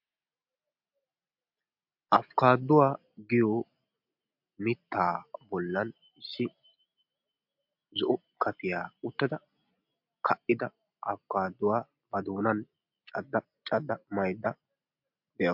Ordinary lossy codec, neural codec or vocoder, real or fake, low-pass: MP3, 48 kbps; none; real; 5.4 kHz